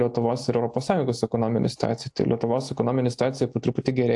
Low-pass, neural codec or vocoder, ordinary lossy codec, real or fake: 10.8 kHz; none; AAC, 64 kbps; real